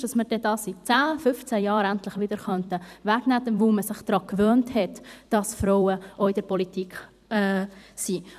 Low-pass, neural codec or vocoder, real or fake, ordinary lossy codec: 14.4 kHz; vocoder, 44.1 kHz, 128 mel bands every 256 samples, BigVGAN v2; fake; none